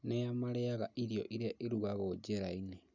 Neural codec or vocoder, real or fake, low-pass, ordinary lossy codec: none; real; 7.2 kHz; none